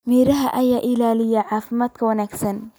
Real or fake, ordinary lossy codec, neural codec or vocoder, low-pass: real; none; none; none